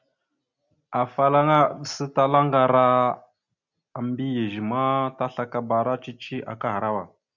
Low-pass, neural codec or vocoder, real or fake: 7.2 kHz; none; real